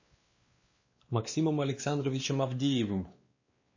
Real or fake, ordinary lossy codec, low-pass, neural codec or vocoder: fake; MP3, 32 kbps; 7.2 kHz; codec, 16 kHz, 2 kbps, X-Codec, WavLM features, trained on Multilingual LibriSpeech